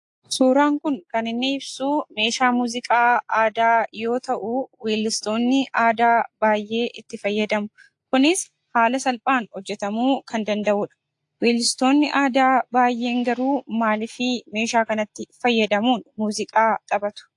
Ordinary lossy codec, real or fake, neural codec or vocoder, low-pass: AAC, 64 kbps; real; none; 10.8 kHz